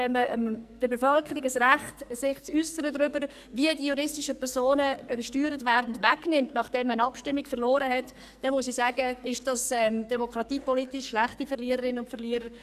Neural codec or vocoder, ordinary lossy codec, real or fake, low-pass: codec, 44.1 kHz, 2.6 kbps, SNAC; none; fake; 14.4 kHz